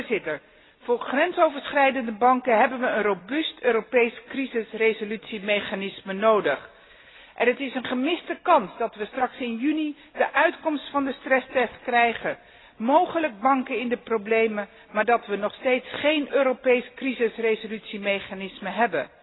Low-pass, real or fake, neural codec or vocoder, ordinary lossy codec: 7.2 kHz; real; none; AAC, 16 kbps